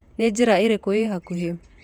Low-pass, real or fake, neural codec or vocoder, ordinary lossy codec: 19.8 kHz; fake; vocoder, 48 kHz, 128 mel bands, Vocos; none